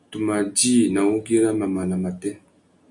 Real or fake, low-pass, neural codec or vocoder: real; 10.8 kHz; none